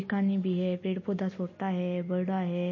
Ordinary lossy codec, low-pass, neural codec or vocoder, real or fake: MP3, 32 kbps; 7.2 kHz; none; real